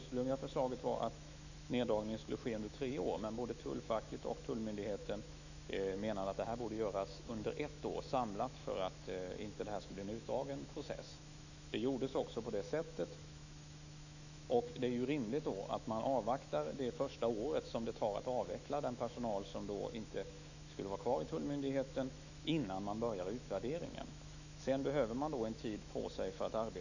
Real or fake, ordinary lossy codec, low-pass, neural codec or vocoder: fake; none; 7.2 kHz; autoencoder, 48 kHz, 128 numbers a frame, DAC-VAE, trained on Japanese speech